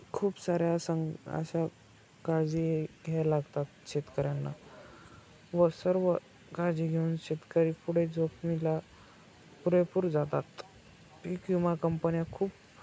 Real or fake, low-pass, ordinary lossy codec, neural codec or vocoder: real; none; none; none